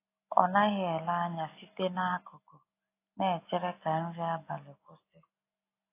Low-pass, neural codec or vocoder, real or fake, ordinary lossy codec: 3.6 kHz; none; real; AAC, 24 kbps